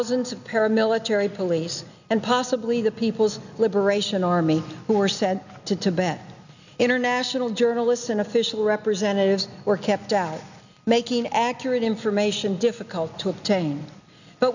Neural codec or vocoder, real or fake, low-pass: none; real; 7.2 kHz